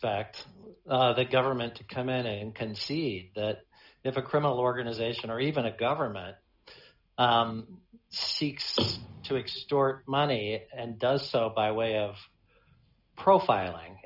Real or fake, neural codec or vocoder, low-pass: real; none; 5.4 kHz